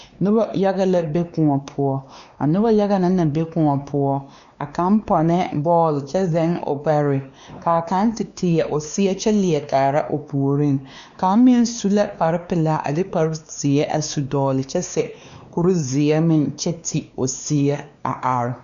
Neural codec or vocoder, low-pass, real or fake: codec, 16 kHz, 2 kbps, X-Codec, WavLM features, trained on Multilingual LibriSpeech; 7.2 kHz; fake